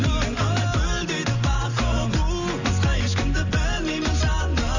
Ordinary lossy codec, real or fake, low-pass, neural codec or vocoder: none; real; 7.2 kHz; none